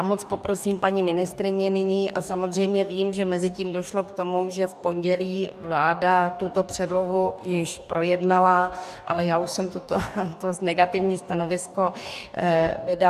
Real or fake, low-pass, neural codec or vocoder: fake; 14.4 kHz; codec, 44.1 kHz, 2.6 kbps, DAC